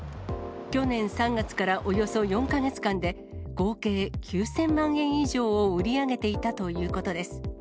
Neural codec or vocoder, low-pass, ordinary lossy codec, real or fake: none; none; none; real